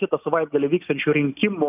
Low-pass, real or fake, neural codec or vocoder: 3.6 kHz; real; none